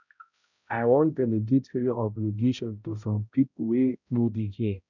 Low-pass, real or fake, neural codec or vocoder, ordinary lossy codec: 7.2 kHz; fake; codec, 16 kHz, 0.5 kbps, X-Codec, HuBERT features, trained on balanced general audio; none